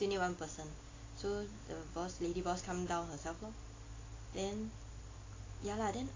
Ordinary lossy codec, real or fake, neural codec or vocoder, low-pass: AAC, 32 kbps; real; none; 7.2 kHz